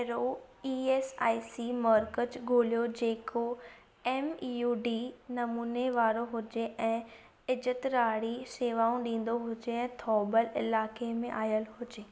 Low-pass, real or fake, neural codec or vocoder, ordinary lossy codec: none; real; none; none